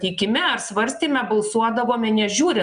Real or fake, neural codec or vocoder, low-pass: real; none; 9.9 kHz